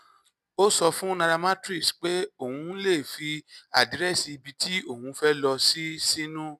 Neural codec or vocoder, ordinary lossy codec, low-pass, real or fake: none; none; 14.4 kHz; real